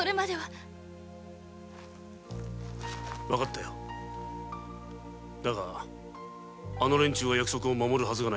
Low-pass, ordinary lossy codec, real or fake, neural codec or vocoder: none; none; real; none